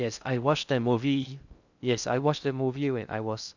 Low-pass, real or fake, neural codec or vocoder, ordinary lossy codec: 7.2 kHz; fake; codec, 16 kHz in and 24 kHz out, 0.6 kbps, FocalCodec, streaming, 2048 codes; none